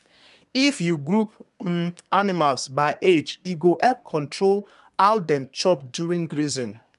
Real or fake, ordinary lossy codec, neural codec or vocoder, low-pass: fake; none; codec, 24 kHz, 1 kbps, SNAC; 10.8 kHz